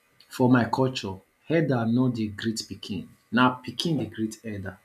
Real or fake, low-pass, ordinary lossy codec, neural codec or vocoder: real; 14.4 kHz; none; none